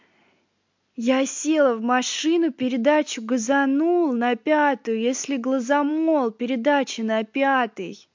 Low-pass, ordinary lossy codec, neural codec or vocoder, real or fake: 7.2 kHz; MP3, 48 kbps; none; real